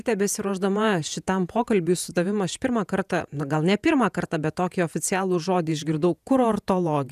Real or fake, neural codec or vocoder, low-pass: fake; vocoder, 48 kHz, 128 mel bands, Vocos; 14.4 kHz